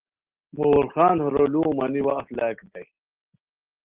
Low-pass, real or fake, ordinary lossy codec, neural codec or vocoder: 3.6 kHz; real; Opus, 16 kbps; none